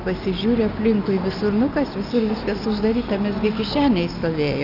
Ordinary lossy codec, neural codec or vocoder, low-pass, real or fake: AAC, 48 kbps; none; 5.4 kHz; real